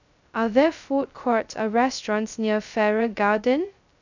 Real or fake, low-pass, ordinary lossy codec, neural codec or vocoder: fake; 7.2 kHz; none; codec, 16 kHz, 0.2 kbps, FocalCodec